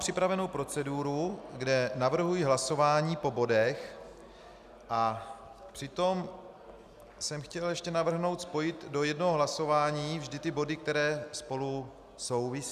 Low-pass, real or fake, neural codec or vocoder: 14.4 kHz; real; none